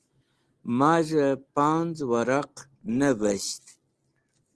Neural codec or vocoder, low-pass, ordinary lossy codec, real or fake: none; 10.8 kHz; Opus, 16 kbps; real